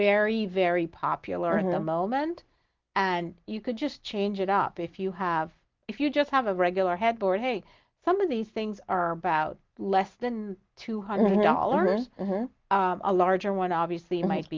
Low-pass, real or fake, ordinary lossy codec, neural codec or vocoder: 7.2 kHz; real; Opus, 32 kbps; none